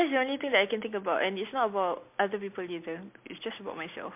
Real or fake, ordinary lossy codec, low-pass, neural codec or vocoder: real; MP3, 32 kbps; 3.6 kHz; none